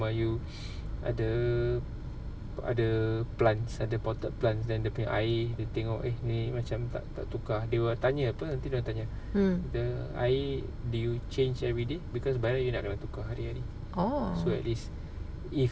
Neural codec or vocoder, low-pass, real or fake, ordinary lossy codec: none; none; real; none